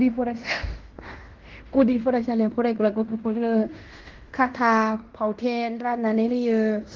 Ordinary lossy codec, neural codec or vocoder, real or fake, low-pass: Opus, 16 kbps; codec, 16 kHz in and 24 kHz out, 0.9 kbps, LongCat-Audio-Codec, fine tuned four codebook decoder; fake; 7.2 kHz